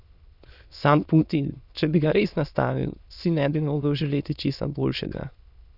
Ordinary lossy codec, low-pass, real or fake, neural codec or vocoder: none; 5.4 kHz; fake; autoencoder, 22.05 kHz, a latent of 192 numbers a frame, VITS, trained on many speakers